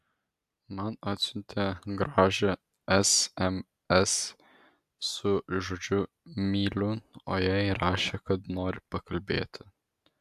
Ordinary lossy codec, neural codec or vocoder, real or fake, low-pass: AAC, 96 kbps; none; real; 14.4 kHz